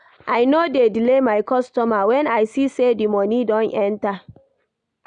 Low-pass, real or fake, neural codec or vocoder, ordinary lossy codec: 9.9 kHz; real; none; none